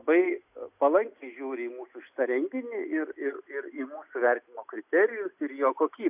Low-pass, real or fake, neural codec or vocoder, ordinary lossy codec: 3.6 kHz; real; none; AAC, 32 kbps